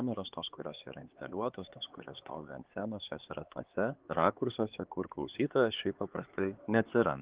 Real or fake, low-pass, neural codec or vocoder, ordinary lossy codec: fake; 3.6 kHz; codec, 16 kHz, 4 kbps, X-Codec, HuBERT features, trained on LibriSpeech; Opus, 16 kbps